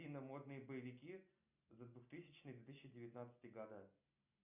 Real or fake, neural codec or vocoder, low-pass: real; none; 3.6 kHz